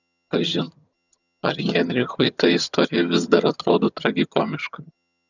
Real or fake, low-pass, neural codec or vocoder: fake; 7.2 kHz; vocoder, 22.05 kHz, 80 mel bands, HiFi-GAN